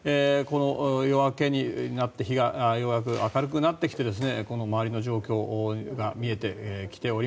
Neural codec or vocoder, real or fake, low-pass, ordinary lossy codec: none; real; none; none